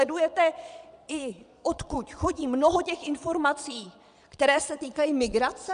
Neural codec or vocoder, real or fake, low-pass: vocoder, 22.05 kHz, 80 mel bands, Vocos; fake; 9.9 kHz